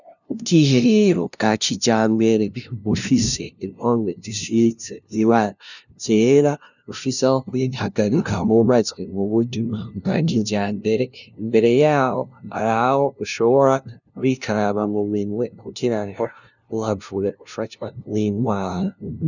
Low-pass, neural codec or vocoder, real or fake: 7.2 kHz; codec, 16 kHz, 0.5 kbps, FunCodec, trained on LibriTTS, 25 frames a second; fake